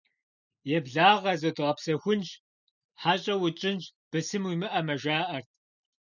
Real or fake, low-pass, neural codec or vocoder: real; 7.2 kHz; none